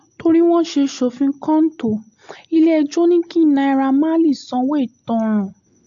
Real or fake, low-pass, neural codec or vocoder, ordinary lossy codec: real; 7.2 kHz; none; AAC, 64 kbps